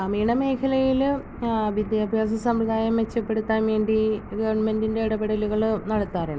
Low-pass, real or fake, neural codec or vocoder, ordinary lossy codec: none; real; none; none